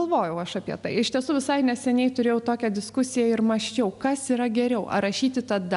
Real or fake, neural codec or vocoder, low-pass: real; none; 10.8 kHz